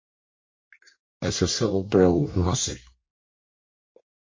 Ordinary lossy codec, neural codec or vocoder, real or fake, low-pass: MP3, 32 kbps; codec, 24 kHz, 1 kbps, SNAC; fake; 7.2 kHz